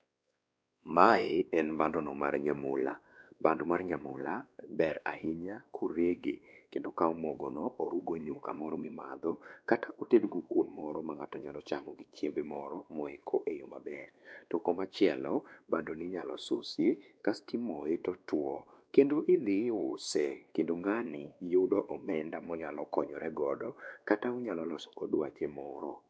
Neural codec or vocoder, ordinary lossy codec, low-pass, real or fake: codec, 16 kHz, 2 kbps, X-Codec, WavLM features, trained on Multilingual LibriSpeech; none; none; fake